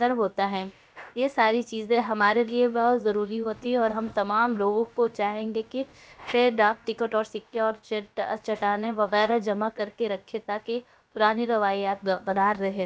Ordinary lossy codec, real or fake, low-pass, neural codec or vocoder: none; fake; none; codec, 16 kHz, about 1 kbps, DyCAST, with the encoder's durations